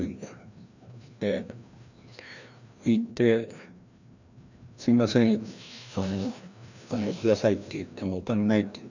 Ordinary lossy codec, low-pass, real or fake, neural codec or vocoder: none; 7.2 kHz; fake; codec, 16 kHz, 1 kbps, FreqCodec, larger model